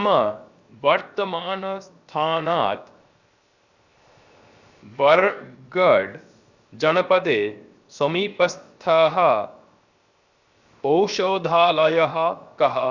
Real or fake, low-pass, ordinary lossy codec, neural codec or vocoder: fake; 7.2 kHz; Opus, 64 kbps; codec, 16 kHz, 0.7 kbps, FocalCodec